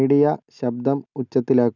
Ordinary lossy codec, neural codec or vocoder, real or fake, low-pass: none; none; real; 7.2 kHz